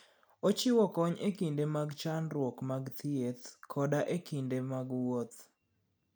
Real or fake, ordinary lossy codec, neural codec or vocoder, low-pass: real; none; none; none